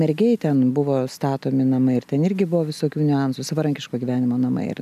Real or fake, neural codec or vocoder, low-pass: real; none; 14.4 kHz